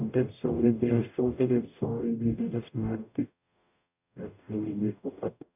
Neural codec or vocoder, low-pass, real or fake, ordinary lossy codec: codec, 44.1 kHz, 0.9 kbps, DAC; 3.6 kHz; fake; none